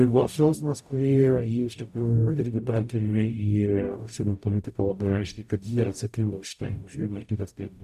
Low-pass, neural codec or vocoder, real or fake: 14.4 kHz; codec, 44.1 kHz, 0.9 kbps, DAC; fake